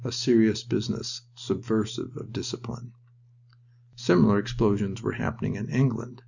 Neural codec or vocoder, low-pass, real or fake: none; 7.2 kHz; real